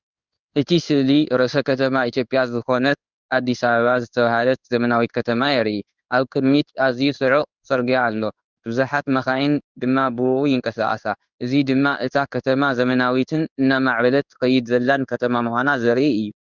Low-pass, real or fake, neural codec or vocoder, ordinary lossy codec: 7.2 kHz; fake; codec, 16 kHz in and 24 kHz out, 1 kbps, XY-Tokenizer; Opus, 64 kbps